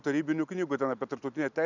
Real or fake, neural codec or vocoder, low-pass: real; none; 7.2 kHz